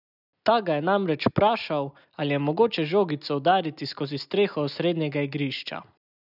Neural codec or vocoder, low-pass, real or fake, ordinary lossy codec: none; 5.4 kHz; real; none